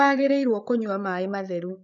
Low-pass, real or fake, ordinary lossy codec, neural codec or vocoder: 7.2 kHz; fake; none; codec, 16 kHz, 8 kbps, FreqCodec, larger model